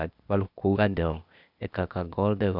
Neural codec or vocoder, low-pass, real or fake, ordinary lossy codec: codec, 16 kHz, 0.8 kbps, ZipCodec; 5.4 kHz; fake; none